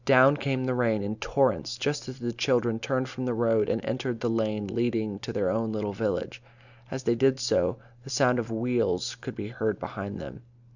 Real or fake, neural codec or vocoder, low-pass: real; none; 7.2 kHz